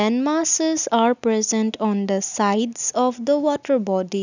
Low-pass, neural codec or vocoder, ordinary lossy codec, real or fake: 7.2 kHz; none; none; real